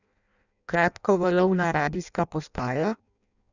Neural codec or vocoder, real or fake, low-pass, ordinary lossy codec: codec, 16 kHz in and 24 kHz out, 0.6 kbps, FireRedTTS-2 codec; fake; 7.2 kHz; none